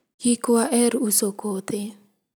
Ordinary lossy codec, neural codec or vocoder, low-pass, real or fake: none; none; none; real